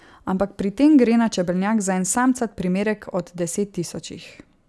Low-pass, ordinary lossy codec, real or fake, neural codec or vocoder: none; none; real; none